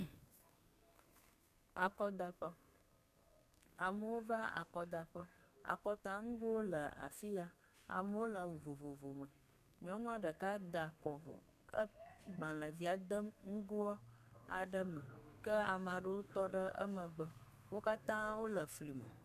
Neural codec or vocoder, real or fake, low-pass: codec, 32 kHz, 1.9 kbps, SNAC; fake; 14.4 kHz